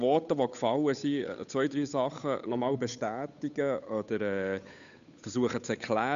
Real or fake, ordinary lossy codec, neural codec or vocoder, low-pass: fake; none; codec, 16 kHz, 8 kbps, FunCodec, trained on Chinese and English, 25 frames a second; 7.2 kHz